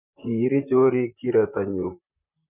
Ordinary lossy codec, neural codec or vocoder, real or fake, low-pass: none; vocoder, 44.1 kHz, 128 mel bands, Pupu-Vocoder; fake; 3.6 kHz